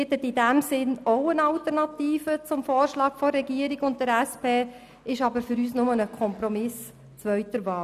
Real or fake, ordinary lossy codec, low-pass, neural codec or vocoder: real; none; 14.4 kHz; none